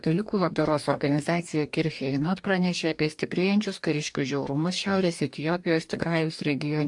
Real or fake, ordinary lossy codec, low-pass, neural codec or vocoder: fake; AAC, 64 kbps; 10.8 kHz; codec, 44.1 kHz, 2.6 kbps, DAC